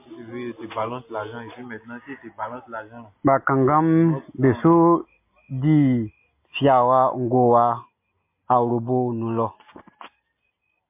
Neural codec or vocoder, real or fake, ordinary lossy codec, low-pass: none; real; MP3, 24 kbps; 3.6 kHz